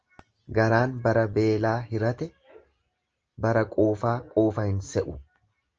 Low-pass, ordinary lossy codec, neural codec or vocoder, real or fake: 7.2 kHz; Opus, 24 kbps; none; real